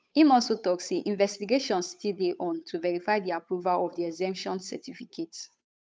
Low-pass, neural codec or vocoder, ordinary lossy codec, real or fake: none; codec, 16 kHz, 8 kbps, FunCodec, trained on Chinese and English, 25 frames a second; none; fake